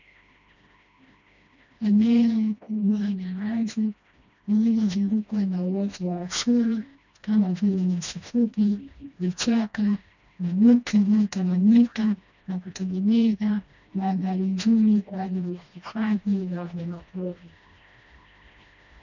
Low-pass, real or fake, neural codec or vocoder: 7.2 kHz; fake; codec, 16 kHz, 1 kbps, FreqCodec, smaller model